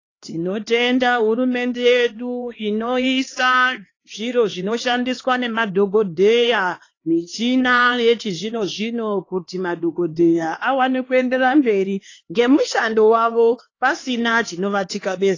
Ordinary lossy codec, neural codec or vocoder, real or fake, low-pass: AAC, 32 kbps; codec, 16 kHz, 2 kbps, X-Codec, HuBERT features, trained on LibriSpeech; fake; 7.2 kHz